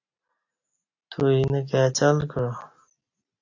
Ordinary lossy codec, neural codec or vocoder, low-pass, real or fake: AAC, 48 kbps; none; 7.2 kHz; real